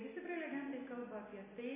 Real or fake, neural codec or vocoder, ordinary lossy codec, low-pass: real; none; MP3, 16 kbps; 3.6 kHz